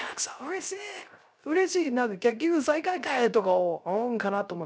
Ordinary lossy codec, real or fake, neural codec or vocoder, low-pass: none; fake; codec, 16 kHz, 0.3 kbps, FocalCodec; none